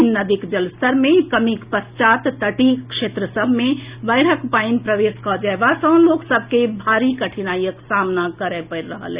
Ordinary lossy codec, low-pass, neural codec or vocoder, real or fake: Opus, 64 kbps; 3.6 kHz; none; real